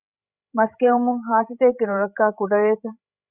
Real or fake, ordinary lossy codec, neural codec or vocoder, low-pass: fake; Opus, 64 kbps; codec, 16 kHz, 16 kbps, FreqCodec, larger model; 3.6 kHz